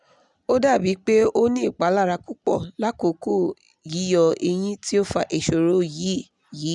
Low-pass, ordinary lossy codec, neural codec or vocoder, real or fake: 10.8 kHz; none; none; real